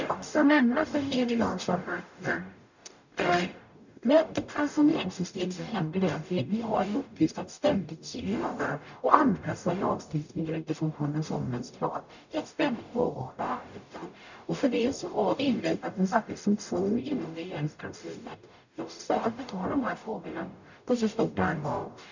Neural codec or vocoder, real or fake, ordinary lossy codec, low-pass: codec, 44.1 kHz, 0.9 kbps, DAC; fake; none; 7.2 kHz